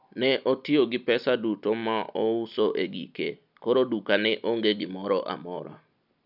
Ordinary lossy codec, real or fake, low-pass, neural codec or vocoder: none; real; 5.4 kHz; none